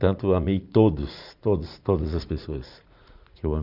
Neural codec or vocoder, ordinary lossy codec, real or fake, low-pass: vocoder, 44.1 kHz, 80 mel bands, Vocos; none; fake; 5.4 kHz